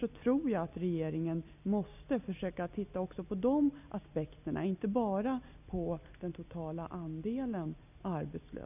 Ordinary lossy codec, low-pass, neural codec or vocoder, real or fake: none; 3.6 kHz; none; real